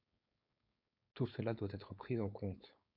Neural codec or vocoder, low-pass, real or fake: codec, 16 kHz, 4.8 kbps, FACodec; 5.4 kHz; fake